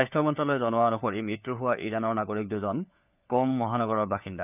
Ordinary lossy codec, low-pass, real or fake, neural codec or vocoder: none; 3.6 kHz; fake; codec, 16 kHz, 4 kbps, FunCodec, trained on LibriTTS, 50 frames a second